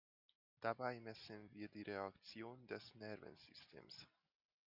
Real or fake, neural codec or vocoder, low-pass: real; none; 5.4 kHz